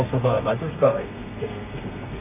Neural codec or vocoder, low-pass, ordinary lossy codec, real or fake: codec, 32 kHz, 1.9 kbps, SNAC; 3.6 kHz; none; fake